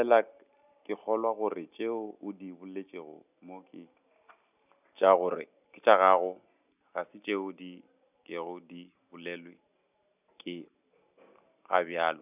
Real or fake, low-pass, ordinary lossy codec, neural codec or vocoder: real; 3.6 kHz; none; none